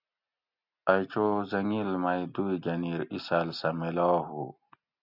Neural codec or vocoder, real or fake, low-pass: none; real; 5.4 kHz